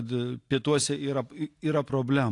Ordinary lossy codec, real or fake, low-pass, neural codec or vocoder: AAC, 48 kbps; real; 10.8 kHz; none